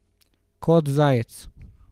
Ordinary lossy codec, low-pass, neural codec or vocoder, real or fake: Opus, 24 kbps; 14.4 kHz; none; real